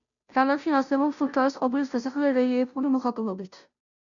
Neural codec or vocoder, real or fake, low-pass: codec, 16 kHz, 0.5 kbps, FunCodec, trained on Chinese and English, 25 frames a second; fake; 7.2 kHz